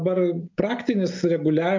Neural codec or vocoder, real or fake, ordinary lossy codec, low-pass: none; real; MP3, 64 kbps; 7.2 kHz